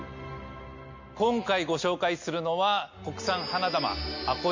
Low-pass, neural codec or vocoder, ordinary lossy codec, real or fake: 7.2 kHz; none; MP3, 48 kbps; real